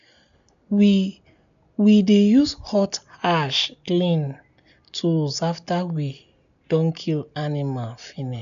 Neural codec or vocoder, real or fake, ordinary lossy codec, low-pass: none; real; none; 7.2 kHz